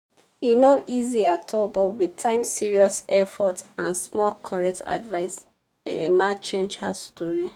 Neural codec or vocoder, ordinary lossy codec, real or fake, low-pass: codec, 44.1 kHz, 2.6 kbps, DAC; none; fake; 19.8 kHz